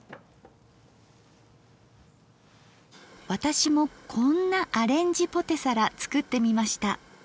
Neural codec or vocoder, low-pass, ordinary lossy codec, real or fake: none; none; none; real